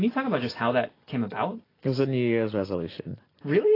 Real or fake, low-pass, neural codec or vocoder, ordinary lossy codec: real; 5.4 kHz; none; AAC, 24 kbps